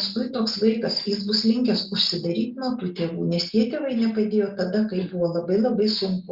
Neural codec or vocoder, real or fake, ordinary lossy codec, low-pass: none; real; Opus, 64 kbps; 5.4 kHz